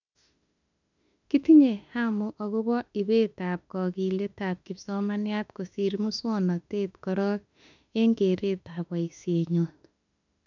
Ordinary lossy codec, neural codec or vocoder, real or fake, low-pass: none; autoencoder, 48 kHz, 32 numbers a frame, DAC-VAE, trained on Japanese speech; fake; 7.2 kHz